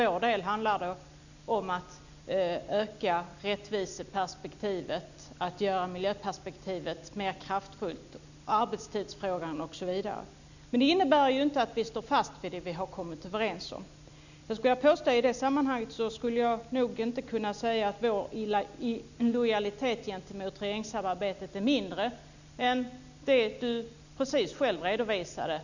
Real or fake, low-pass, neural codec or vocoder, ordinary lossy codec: real; 7.2 kHz; none; none